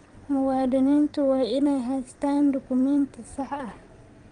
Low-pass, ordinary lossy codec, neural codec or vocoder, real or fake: 9.9 kHz; Opus, 32 kbps; vocoder, 22.05 kHz, 80 mel bands, WaveNeXt; fake